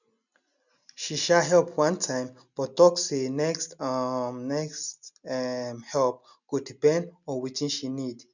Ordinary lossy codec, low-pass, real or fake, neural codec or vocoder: none; 7.2 kHz; real; none